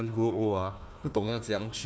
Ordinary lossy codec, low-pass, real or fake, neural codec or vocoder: none; none; fake; codec, 16 kHz, 1 kbps, FunCodec, trained on Chinese and English, 50 frames a second